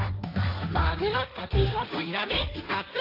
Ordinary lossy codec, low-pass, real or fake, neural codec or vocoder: AAC, 32 kbps; 5.4 kHz; fake; codec, 16 kHz, 1.1 kbps, Voila-Tokenizer